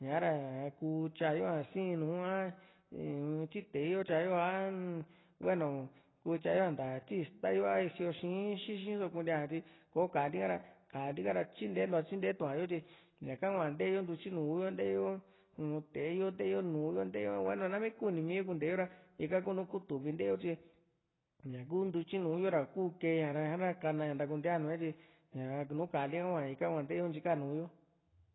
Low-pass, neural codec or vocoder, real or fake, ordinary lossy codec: 7.2 kHz; none; real; AAC, 16 kbps